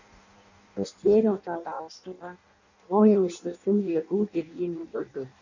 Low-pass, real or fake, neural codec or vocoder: 7.2 kHz; fake; codec, 16 kHz in and 24 kHz out, 0.6 kbps, FireRedTTS-2 codec